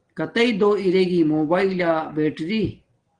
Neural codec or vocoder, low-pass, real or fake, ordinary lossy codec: none; 9.9 kHz; real; Opus, 16 kbps